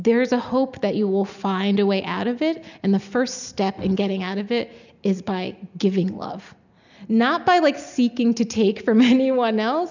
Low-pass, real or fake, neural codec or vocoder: 7.2 kHz; real; none